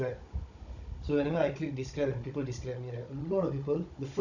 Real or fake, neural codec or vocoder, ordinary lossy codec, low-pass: fake; codec, 16 kHz, 16 kbps, FunCodec, trained on Chinese and English, 50 frames a second; none; 7.2 kHz